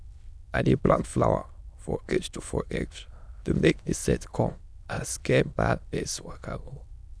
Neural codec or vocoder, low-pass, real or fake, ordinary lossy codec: autoencoder, 22.05 kHz, a latent of 192 numbers a frame, VITS, trained on many speakers; none; fake; none